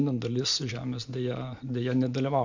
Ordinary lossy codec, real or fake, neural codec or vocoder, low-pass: MP3, 64 kbps; real; none; 7.2 kHz